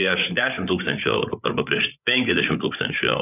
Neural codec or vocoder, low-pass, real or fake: codec, 16 kHz, 8 kbps, FreqCodec, larger model; 3.6 kHz; fake